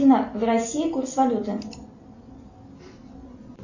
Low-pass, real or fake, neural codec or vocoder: 7.2 kHz; real; none